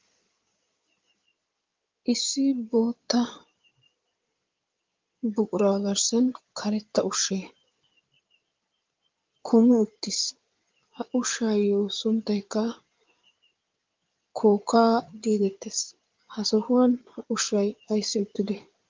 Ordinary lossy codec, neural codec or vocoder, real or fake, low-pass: Opus, 32 kbps; codec, 16 kHz in and 24 kHz out, 2.2 kbps, FireRedTTS-2 codec; fake; 7.2 kHz